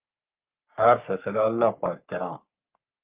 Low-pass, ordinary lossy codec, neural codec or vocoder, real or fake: 3.6 kHz; Opus, 24 kbps; codec, 44.1 kHz, 3.4 kbps, Pupu-Codec; fake